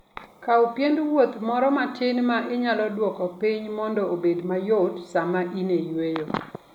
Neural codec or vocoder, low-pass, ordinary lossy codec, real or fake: none; 19.8 kHz; none; real